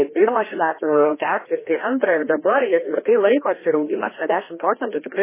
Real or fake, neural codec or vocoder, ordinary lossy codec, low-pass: fake; codec, 16 kHz, 1 kbps, FreqCodec, larger model; MP3, 16 kbps; 3.6 kHz